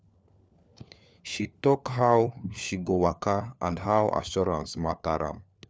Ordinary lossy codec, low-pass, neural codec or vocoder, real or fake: none; none; codec, 16 kHz, 4 kbps, FunCodec, trained on LibriTTS, 50 frames a second; fake